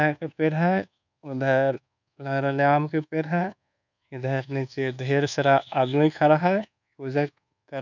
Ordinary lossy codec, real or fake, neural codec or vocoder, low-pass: none; fake; codec, 24 kHz, 1.2 kbps, DualCodec; 7.2 kHz